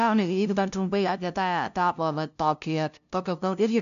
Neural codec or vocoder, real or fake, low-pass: codec, 16 kHz, 0.5 kbps, FunCodec, trained on LibriTTS, 25 frames a second; fake; 7.2 kHz